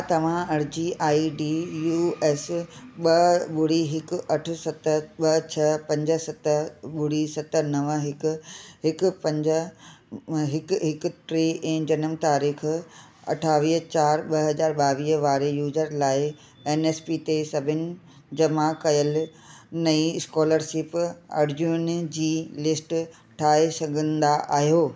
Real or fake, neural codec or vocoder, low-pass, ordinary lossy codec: real; none; none; none